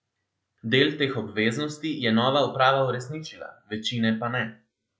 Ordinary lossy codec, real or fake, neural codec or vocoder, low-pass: none; real; none; none